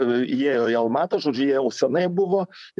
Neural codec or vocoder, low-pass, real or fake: vocoder, 44.1 kHz, 128 mel bands, Pupu-Vocoder; 10.8 kHz; fake